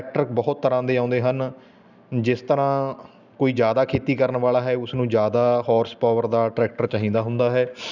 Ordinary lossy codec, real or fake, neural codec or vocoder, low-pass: none; real; none; 7.2 kHz